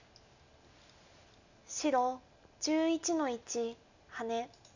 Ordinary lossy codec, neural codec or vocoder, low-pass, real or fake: none; none; 7.2 kHz; real